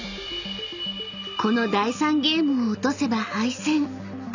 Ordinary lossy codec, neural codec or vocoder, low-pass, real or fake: none; none; 7.2 kHz; real